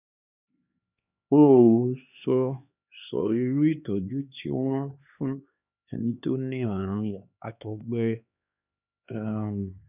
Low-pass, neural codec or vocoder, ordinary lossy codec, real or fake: 3.6 kHz; codec, 16 kHz, 2 kbps, X-Codec, HuBERT features, trained on LibriSpeech; none; fake